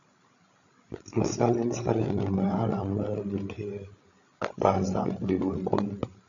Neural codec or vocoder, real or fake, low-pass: codec, 16 kHz, 16 kbps, FreqCodec, larger model; fake; 7.2 kHz